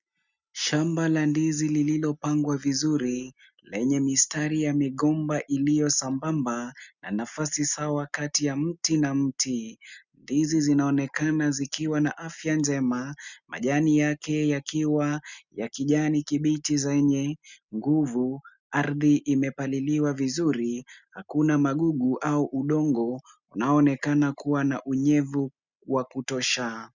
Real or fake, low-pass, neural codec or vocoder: real; 7.2 kHz; none